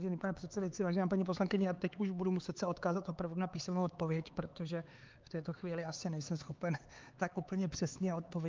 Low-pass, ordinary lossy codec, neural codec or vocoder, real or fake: 7.2 kHz; Opus, 24 kbps; codec, 16 kHz, 4 kbps, X-Codec, HuBERT features, trained on LibriSpeech; fake